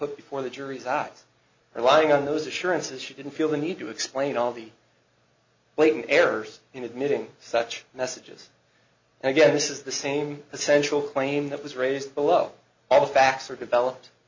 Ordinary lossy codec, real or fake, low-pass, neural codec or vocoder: MP3, 64 kbps; real; 7.2 kHz; none